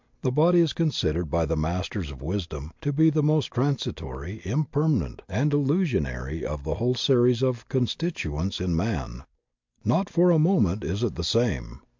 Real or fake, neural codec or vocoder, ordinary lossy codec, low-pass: real; none; MP3, 64 kbps; 7.2 kHz